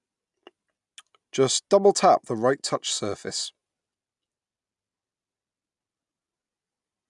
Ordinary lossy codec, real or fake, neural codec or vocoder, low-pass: none; real; none; 10.8 kHz